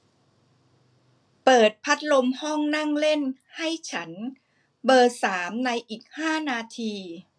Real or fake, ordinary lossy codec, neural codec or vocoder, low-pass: real; none; none; none